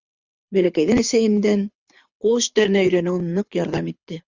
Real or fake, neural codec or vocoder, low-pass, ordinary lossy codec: fake; codec, 24 kHz, 6 kbps, HILCodec; 7.2 kHz; Opus, 64 kbps